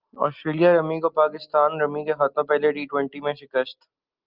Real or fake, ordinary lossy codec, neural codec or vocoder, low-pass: real; Opus, 32 kbps; none; 5.4 kHz